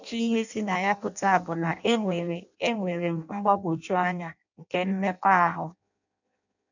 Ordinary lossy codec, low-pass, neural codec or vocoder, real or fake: none; 7.2 kHz; codec, 16 kHz in and 24 kHz out, 0.6 kbps, FireRedTTS-2 codec; fake